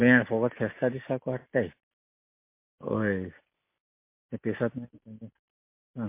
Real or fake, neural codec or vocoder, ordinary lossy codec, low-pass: real; none; MP3, 24 kbps; 3.6 kHz